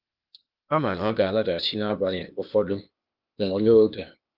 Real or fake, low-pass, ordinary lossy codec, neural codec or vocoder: fake; 5.4 kHz; Opus, 24 kbps; codec, 16 kHz, 0.8 kbps, ZipCodec